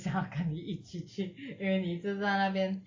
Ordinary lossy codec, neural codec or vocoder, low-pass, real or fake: AAC, 32 kbps; none; 7.2 kHz; real